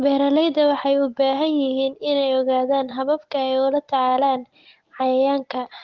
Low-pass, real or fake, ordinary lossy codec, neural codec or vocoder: 7.2 kHz; real; Opus, 16 kbps; none